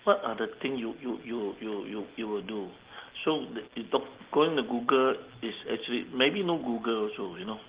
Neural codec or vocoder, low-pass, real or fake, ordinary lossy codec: none; 3.6 kHz; real; Opus, 16 kbps